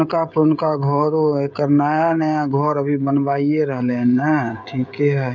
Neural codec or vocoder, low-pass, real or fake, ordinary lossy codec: codec, 16 kHz, 16 kbps, FreqCodec, smaller model; 7.2 kHz; fake; none